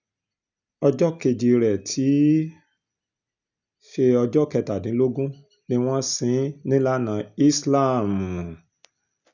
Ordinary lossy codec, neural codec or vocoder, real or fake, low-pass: none; none; real; 7.2 kHz